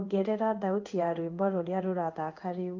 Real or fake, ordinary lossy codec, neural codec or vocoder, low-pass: fake; Opus, 24 kbps; codec, 16 kHz in and 24 kHz out, 1 kbps, XY-Tokenizer; 7.2 kHz